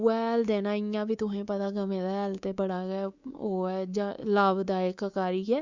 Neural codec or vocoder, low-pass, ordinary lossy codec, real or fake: codec, 24 kHz, 3.1 kbps, DualCodec; 7.2 kHz; Opus, 64 kbps; fake